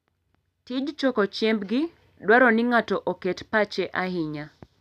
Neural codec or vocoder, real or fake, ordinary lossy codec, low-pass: none; real; none; 14.4 kHz